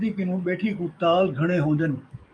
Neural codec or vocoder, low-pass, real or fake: codec, 44.1 kHz, 7.8 kbps, DAC; 9.9 kHz; fake